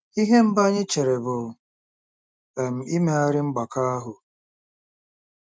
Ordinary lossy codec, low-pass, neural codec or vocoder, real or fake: none; none; none; real